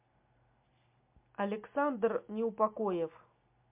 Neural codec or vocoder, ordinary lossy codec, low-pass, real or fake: none; MP3, 32 kbps; 3.6 kHz; real